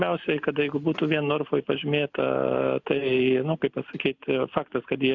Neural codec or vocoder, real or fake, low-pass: none; real; 7.2 kHz